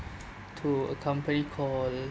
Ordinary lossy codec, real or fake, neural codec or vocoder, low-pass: none; real; none; none